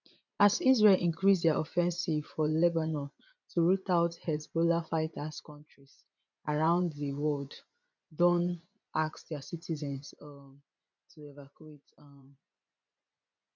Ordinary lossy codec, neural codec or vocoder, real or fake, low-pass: none; vocoder, 24 kHz, 100 mel bands, Vocos; fake; 7.2 kHz